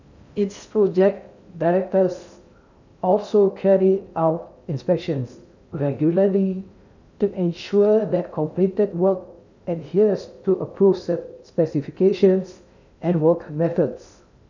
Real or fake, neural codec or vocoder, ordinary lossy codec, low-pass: fake; codec, 16 kHz in and 24 kHz out, 0.6 kbps, FocalCodec, streaming, 2048 codes; none; 7.2 kHz